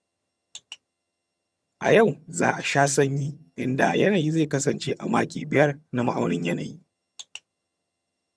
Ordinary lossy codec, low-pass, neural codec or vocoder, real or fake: none; none; vocoder, 22.05 kHz, 80 mel bands, HiFi-GAN; fake